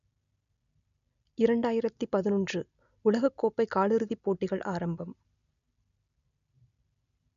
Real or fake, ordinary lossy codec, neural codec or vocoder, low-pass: real; none; none; 7.2 kHz